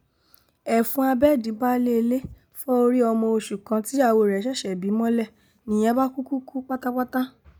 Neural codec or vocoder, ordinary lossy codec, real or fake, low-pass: none; none; real; none